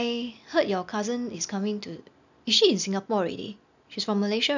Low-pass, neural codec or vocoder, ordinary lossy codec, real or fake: 7.2 kHz; none; none; real